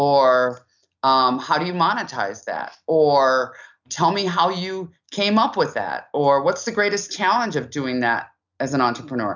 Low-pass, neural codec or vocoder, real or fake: 7.2 kHz; none; real